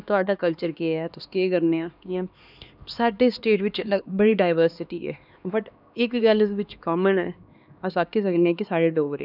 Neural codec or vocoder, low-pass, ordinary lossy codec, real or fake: codec, 16 kHz, 2 kbps, X-Codec, HuBERT features, trained on LibriSpeech; 5.4 kHz; none; fake